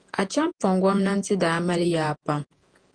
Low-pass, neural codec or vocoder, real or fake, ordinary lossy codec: 9.9 kHz; vocoder, 48 kHz, 128 mel bands, Vocos; fake; Opus, 24 kbps